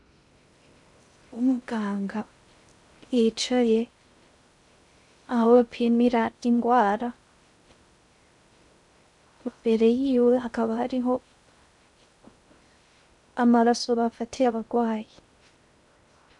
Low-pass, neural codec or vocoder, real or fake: 10.8 kHz; codec, 16 kHz in and 24 kHz out, 0.6 kbps, FocalCodec, streaming, 2048 codes; fake